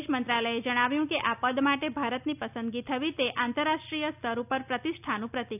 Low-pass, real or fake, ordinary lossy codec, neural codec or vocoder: 3.6 kHz; real; none; none